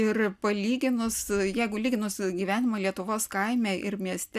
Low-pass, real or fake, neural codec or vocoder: 14.4 kHz; real; none